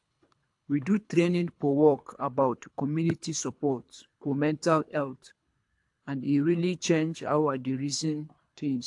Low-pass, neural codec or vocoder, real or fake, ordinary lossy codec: 10.8 kHz; codec, 24 kHz, 3 kbps, HILCodec; fake; AAC, 64 kbps